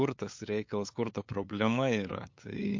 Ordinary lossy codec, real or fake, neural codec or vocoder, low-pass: MP3, 48 kbps; fake; codec, 16 kHz, 8 kbps, FunCodec, trained on LibriTTS, 25 frames a second; 7.2 kHz